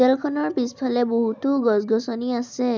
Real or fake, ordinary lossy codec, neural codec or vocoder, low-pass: real; none; none; none